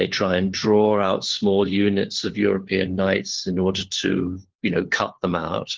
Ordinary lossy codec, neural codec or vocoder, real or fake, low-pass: Opus, 16 kbps; codec, 16 kHz, 4 kbps, FunCodec, trained on LibriTTS, 50 frames a second; fake; 7.2 kHz